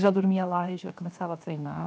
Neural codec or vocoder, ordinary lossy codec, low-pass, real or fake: codec, 16 kHz, 0.7 kbps, FocalCodec; none; none; fake